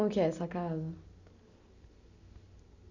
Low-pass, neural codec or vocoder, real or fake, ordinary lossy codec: 7.2 kHz; none; real; none